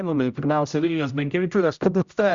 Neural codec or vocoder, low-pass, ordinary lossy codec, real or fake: codec, 16 kHz, 0.5 kbps, X-Codec, HuBERT features, trained on general audio; 7.2 kHz; Opus, 64 kbps; fake